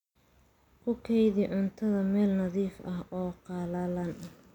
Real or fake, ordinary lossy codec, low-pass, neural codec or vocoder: real; none; 19.8 kHz; none